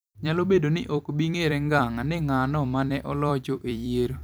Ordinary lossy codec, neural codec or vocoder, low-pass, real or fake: none; vocoder, 44.1 kHz, 128 mel bands every 256 samples, BigVGAN v2; none; fake